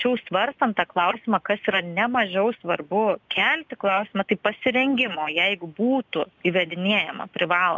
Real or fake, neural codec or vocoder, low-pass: real; none; 7.2 kHz